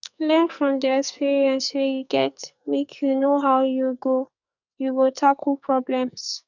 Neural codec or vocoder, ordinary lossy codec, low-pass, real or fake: codec, 44.1 kHz, 2.6 kbps, SNAC; none; 7.2 kHz; fake